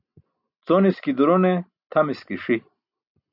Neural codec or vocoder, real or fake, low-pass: none; real; 5.4 kHz